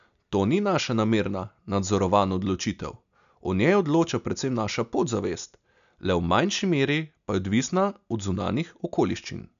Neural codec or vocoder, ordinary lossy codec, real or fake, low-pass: none; none; real; 7.2 kHz